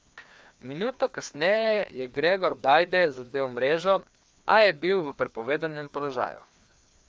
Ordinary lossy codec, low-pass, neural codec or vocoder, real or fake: none; none; codec, 16 kHz, 2 kbps, FreqCodec, larger model; fake